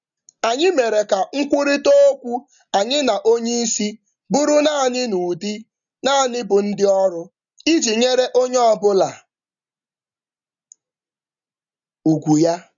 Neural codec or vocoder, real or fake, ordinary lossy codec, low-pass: none; real; none; 7.2 kHz